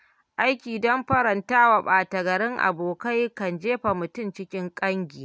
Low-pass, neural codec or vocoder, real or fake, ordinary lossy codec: none; none; real; none